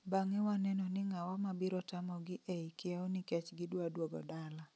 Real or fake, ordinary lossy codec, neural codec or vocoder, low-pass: real; none; none; none